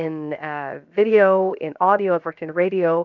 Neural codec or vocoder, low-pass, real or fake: codec, 16 kHz, 0.7 kbps, FocalCodec; 7.2 kHz; fake